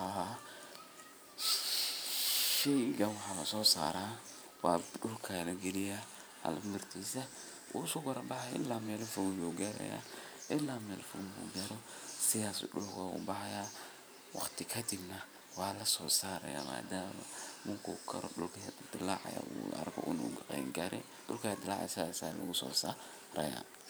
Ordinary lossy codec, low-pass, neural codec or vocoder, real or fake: none; none; vocoder, 44.1 kHz, 128 mel bands every 512 samples, BigVGAN v2; fake